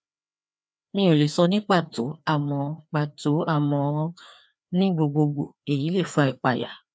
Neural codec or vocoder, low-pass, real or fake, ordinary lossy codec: codec, 16 kHz, 2 kbps, FreqCodec, larger model; none; fake; none